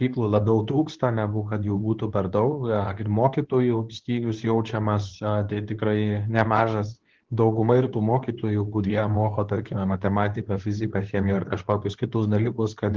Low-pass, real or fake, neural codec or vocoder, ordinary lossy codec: 7.2 kHz; fake; codec, 24 kHz, 0.9 kbps, WavTokenizer, medium speech release version 2; Opus, 16 kbps